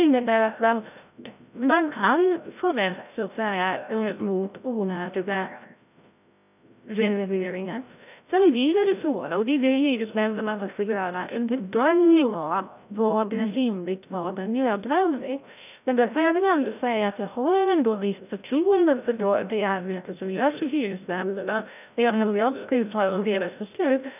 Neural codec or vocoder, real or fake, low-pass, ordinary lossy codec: codec, 16 kHz, 0.5 kbps, FreqCodec, larger model; fake; 3.6 kHz; none